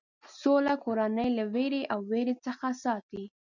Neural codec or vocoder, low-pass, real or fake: none; 7.2 kHz; real